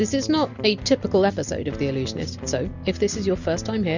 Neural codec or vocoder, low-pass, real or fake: none; 7.2 kHz; real